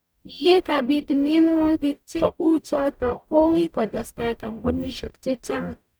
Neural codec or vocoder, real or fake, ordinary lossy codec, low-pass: codec, 44.1 kHz, 0.9 kbps, DAC; fake; none; none